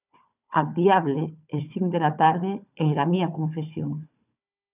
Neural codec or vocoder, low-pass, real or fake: codec, 16 kHz, 4 kbps, FunCodec, trained on Chinese and English, 50 frames a second; 3.6 kHz; fake